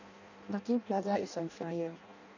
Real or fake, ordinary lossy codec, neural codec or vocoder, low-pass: fake; none; codec, 16 kHz in and 24 kHz out, 0.6 kbps, FireRedTTS-2 codec; 7.2 kHz